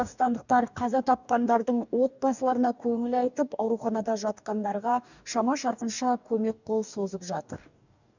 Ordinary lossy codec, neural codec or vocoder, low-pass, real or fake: none; codec, 44.1 kHz, 2.6 kbps, DAC; 7.2 kHz; fake